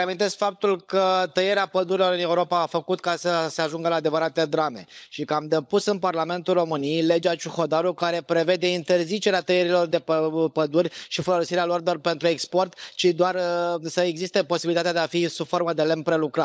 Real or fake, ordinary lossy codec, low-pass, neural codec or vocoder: fake; none; none; codec, 16 kHz, 16 kbps, FunCodec, trained on LibriTTS, 50 frames a second